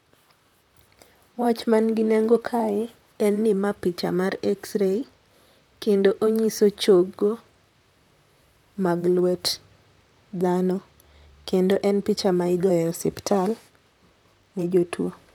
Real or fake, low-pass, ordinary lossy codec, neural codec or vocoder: fake; 19.8 kHz; none; vocoder, 44.1 kHz, 128 mel bands, Pupu-Vocoder